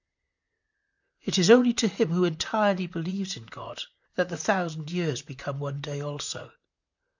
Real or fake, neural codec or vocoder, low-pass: fake; vocoder, 44.1 kHz, 128 mel bands, Pupu-Vocoder; 7.2 kHz